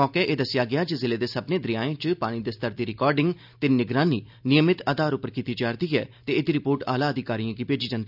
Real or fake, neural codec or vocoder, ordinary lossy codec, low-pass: real; none; none; 5.4 kHz